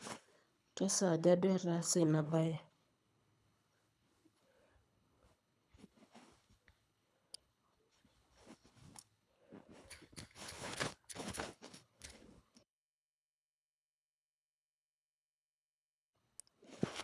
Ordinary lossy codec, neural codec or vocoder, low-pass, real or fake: none; codec, 24 kHz, 3 kbps, HILCodec; none; fake